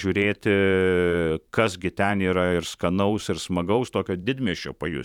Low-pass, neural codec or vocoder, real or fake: 19.8 kHz; vocoder, 44.1 kHz, 128 mel bands every 512 samples, BigVGAN v2; fake